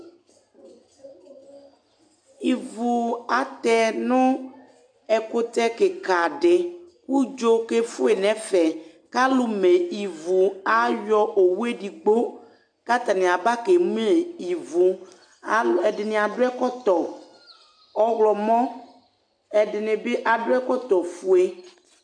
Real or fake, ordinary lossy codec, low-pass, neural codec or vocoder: real; AAC, 64 kbps; 9.9 kHz; none